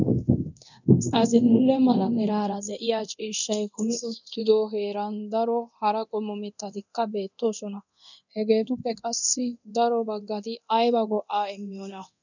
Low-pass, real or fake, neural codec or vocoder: 7.2 kHz; fake; codec, 24 kHz, 0.9 kbps, DualCodec